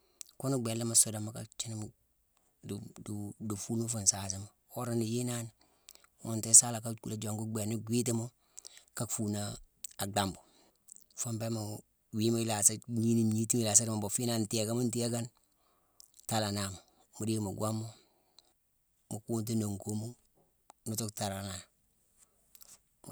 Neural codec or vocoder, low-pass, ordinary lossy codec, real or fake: none; none; none; real